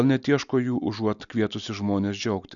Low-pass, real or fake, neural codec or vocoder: 7.2 kHz; real; none